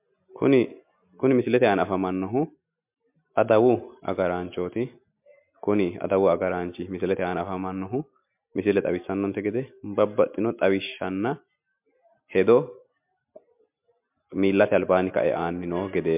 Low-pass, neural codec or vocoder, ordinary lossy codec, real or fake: 3.6 kHz; none; MP3, 32 kbps; real